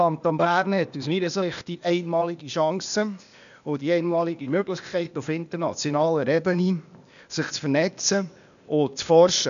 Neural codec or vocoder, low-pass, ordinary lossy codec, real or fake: codec, 16 kHz, 0.8 kbps, ZipCodec; 7.2 kHz; none; fake